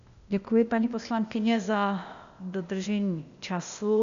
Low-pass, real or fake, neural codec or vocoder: 7.2 kHz; fake; codec, 16 kHz, 0.8 kbps, ZipCodec